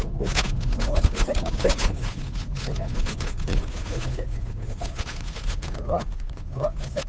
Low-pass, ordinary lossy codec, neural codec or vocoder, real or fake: none; none; codec, 16 kHz, 2 kbps, FunCodec, trained on Chinese and English, 25 frames a second; fake